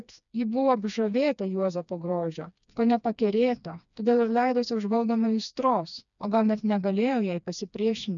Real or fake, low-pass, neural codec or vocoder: fake; 7.2 kHz; codec, 16 kHz, 2 kbps, FreqCodec, smaller model